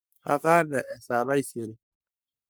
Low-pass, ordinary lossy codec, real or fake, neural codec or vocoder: none; none; fake; codec, 44.1 kHz, 3.4 kbps, Pupu-Codec